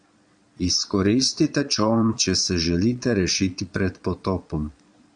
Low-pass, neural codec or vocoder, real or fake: 9.9 kHz; vocoder, 22.05 kHz, 80 mel bands, Vocos; fake